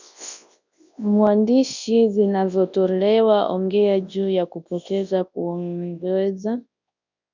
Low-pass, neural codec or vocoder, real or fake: 7.2 kHz; codec, 24 kHz, 0.9 kbps, WavTokenizer, large speech release; fake